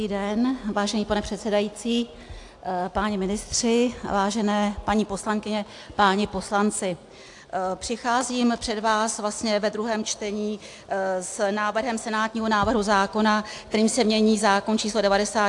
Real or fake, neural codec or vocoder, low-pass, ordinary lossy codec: real; none; 10.8 kHz; AAC, 64 kbps